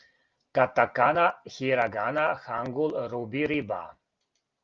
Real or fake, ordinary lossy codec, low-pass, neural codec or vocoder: real; Opus, 32 kbps; 7.2 kHz; none